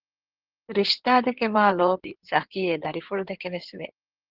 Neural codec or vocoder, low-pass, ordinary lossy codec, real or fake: codec, 16 kHz in and 24 kHz out, 2.2 kbps, FireRedTTS-2 codec; 5.4 kHz; Opus, 16 kbps; fake